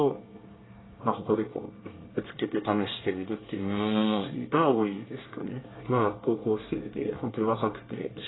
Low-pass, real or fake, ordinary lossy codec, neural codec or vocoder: 7.2 kHz; fake; AAC, 16 kbps; codec, 24 kHz, 1 kbps, SNAC